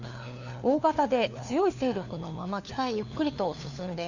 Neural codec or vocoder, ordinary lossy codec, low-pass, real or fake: codec, 16 kHz, 4 kbps, FunCodec, trained on LibriTTS, 50 frames a second; none; 7.2 kHz; fake